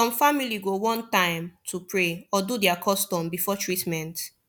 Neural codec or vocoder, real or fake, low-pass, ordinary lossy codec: none; real; none; none